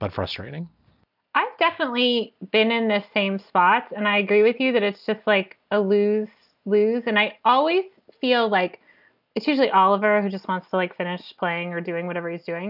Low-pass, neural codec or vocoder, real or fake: 5.4 kHz; none; real